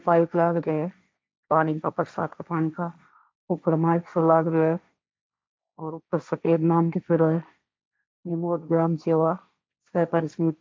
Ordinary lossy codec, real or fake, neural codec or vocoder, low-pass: none; fake; codec, 16 kHz, 1.1 kbps, Voila-Tokenizer; none